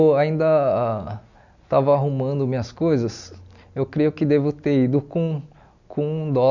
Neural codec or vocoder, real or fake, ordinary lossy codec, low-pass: none; real; none; 7.2 kHz